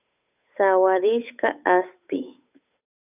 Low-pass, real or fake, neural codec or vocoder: 3.6 kHz; fake; codec, 16 kHz, 8 kbps, FunCodec, trained on Chinese and English, 25 frames a second